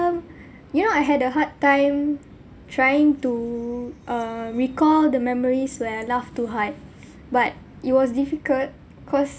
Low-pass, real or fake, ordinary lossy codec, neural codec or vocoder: none; real; none; none